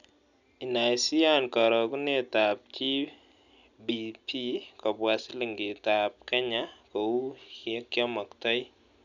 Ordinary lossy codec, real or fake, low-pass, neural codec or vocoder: none; real; 7.2 kHz; none